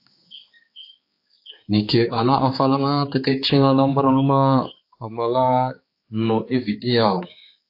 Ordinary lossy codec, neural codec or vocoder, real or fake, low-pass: AAC, 32 kbps; codec, 16 kHz, 4 kbps, X-Codec, HuBERT features, trained on balanced general audio; fake; 5.4 kHz